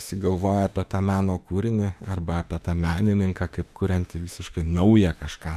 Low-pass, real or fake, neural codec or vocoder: 14.4 kHz; fake; autoencoder, 48 kHz, 32 numbers a frame, DAC-VAE, trained on Japanese speech